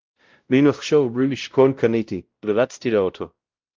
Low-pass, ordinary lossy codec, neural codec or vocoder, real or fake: 7.2 kHz; Opus, 32 kbps; codec, 16 kHz, 0.5 kbps, X-Codec, WavLM features, trained on Multilingual LibriSpeech; fake